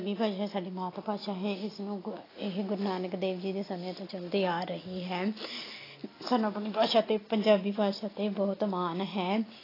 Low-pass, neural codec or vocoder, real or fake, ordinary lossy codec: 5.4 kHz; none; real; AAC, 24 kbps